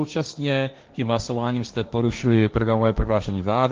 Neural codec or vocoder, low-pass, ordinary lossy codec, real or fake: codec, 16 kHz, 1.1 kbps, Voila-Tokenizer; 7.2 kHz; Opus, 32 kbps; fake